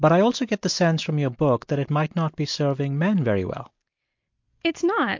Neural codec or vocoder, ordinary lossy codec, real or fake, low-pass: none; MP3, 48 kbps; real; 7.2 kHz